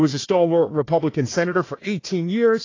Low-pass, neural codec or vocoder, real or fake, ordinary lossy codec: 7.2 kHz; codec, 16 kHz, 1 kbps, FunCodec, trained on Chinese and English, 50 frames a second; fake; AAC, 32 kbps